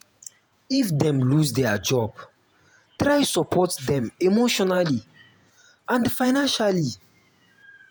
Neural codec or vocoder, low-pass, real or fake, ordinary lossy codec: vocoder, 48 kHz, 128 mel bands, Vocos; none; fake; none